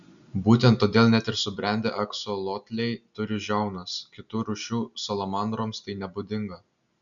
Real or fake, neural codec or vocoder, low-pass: real; none; 7.2 kHz